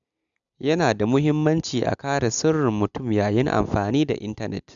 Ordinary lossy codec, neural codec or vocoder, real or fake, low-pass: none; none; real; 7.2 kHz